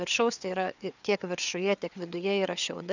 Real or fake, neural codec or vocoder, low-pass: fake; codec, 16 kHz, 4 kbps, FreqCodec, larger model; 7.2 kHz